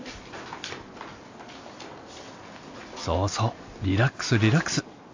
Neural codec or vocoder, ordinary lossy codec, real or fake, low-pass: none; AAC, 48 kbps; real; 7.2 kHz